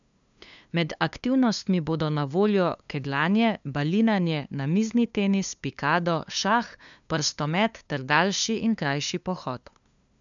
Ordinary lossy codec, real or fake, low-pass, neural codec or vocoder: none; fake; 7.2 kHz; codec, 16 kHz, 2 kbps, FunCodec, trained on LibriTTS, 25 frames a second